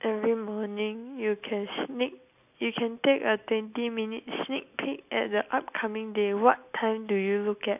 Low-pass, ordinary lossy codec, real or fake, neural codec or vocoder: 3.6 kHz; AAC, 32 kbps; real; none